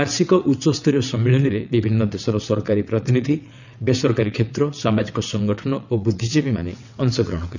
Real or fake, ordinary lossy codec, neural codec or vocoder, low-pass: fake; none; vocoder, 22.05 kHz, 80 mel bands, WaveNeXt; 7.2 kHz